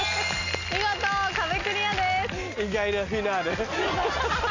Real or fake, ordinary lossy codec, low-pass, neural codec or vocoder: real; AAC, 48 kbps; 7.2 kHz; none